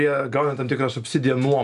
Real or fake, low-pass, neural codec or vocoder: real; 10.8 kHz; none